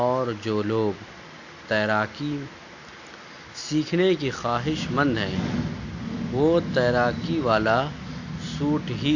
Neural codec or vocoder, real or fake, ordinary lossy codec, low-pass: none; real; AAC, 48 kbps; 7.2 kHz